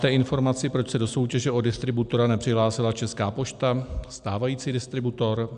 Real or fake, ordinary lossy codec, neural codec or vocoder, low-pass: real; AAC, 96 kbps; none; 9.9 kHz